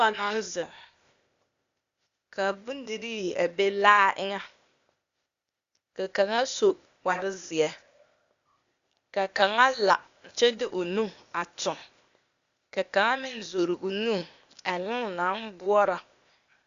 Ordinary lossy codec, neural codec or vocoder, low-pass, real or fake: Opus, 64 kbps; codec, 16 kHz, 0.8 kbps, ZipCodec; 7.2 kHz; fake